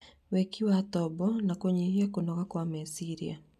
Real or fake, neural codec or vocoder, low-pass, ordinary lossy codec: real; none; 14.4 kHz; none